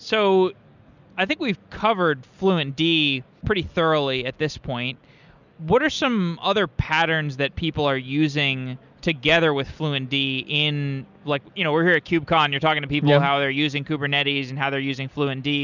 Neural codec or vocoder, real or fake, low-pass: none; real; 7.2 kHz